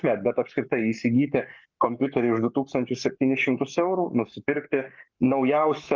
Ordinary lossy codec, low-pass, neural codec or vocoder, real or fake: Opus, 24 kbps; 7.2 kHz; codec, 44.1 kHz, 7.8 kbps, Pupu-Codec; fake